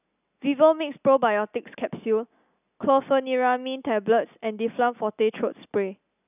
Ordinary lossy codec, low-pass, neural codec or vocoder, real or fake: none; 3.6 kHz; none; real